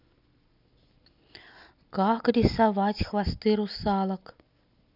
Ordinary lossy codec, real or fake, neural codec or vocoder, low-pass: none; real; none; 5.4 kHz